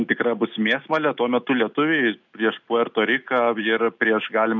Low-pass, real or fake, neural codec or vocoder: 7.2 kHz; real; none